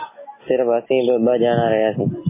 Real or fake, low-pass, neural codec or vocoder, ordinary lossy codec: real; 3.6 kHz; none; MP3, 16 kbps